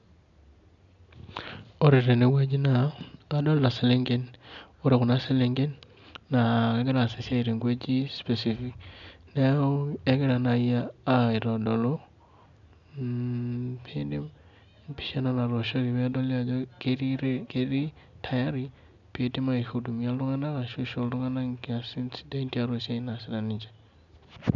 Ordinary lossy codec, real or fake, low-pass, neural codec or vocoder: none; real; 7.2 kHz; none